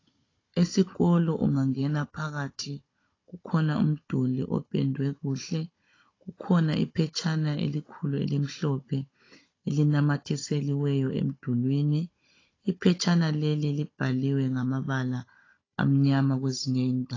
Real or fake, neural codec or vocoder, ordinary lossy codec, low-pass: fake; codec, 16 kHz, 16 kbps, FunCodec, trained on Chinese and English, 50 frames a second; AAC, 32 kbps; 7.2 kHz